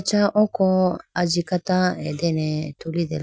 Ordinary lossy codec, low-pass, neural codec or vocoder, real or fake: none; none; none; real